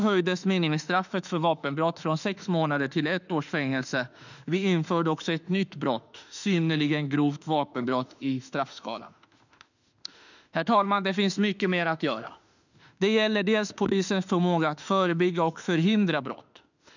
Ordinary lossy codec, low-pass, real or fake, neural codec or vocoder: none; 7.2 kHz; fake; autoencoder, 48 kHz, 32 numbers a frame, DAC-VAE, trained on Japanese speech